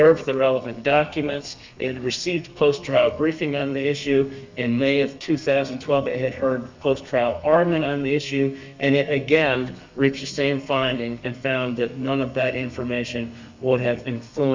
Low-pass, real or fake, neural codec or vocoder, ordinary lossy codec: 7.2 kHz; fake; codec, 32 kHz, 1.9 kbps, SNAC; MP3, 64 kbps